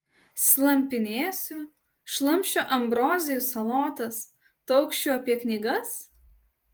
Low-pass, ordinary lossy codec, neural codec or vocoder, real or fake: 19.8 kHz; Opus, 32 kbps; vocoder, 48 kHz, 128 mel bands, Vocos; fake